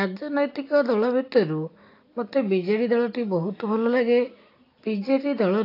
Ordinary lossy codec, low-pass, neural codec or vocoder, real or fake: AAC, 32 kbps; 5.4 kHz; none; real